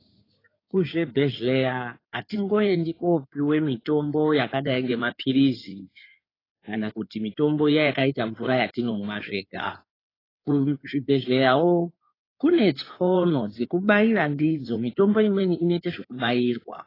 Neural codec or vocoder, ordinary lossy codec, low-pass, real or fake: codec, 16 kHz in and 24 kHz out, 2.2 kbps, FireRedTTS-2 codec; AAC, 24 kbps; 5.4 kHz; fake